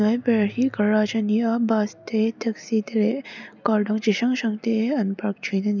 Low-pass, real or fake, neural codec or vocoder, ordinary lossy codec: 7.2 kHz; real; none; none